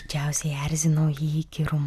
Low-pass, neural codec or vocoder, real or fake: 14.4 kHz; none; real